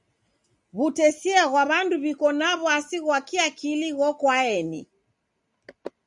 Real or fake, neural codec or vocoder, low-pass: real; none; 10.8 kHz